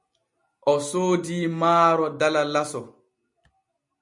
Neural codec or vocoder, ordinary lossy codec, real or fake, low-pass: none; MP3, 48 kbps; real; 10.8 kHz